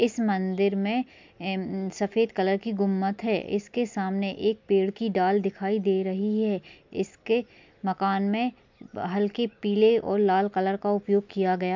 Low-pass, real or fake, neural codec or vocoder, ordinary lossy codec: 7.2 kHz; real; none; MP3, 64 kbps